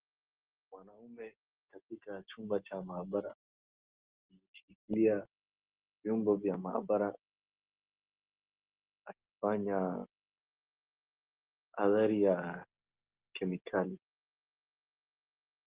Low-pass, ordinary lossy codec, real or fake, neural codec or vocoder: 3.6 kHz; Opus, 16 kbps; real; none